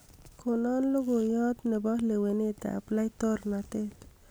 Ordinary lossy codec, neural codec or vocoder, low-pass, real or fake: none; none; none; real